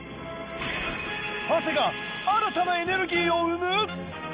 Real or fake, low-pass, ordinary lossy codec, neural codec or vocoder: real; 3.6 kHz; Opus, 32 kbps; none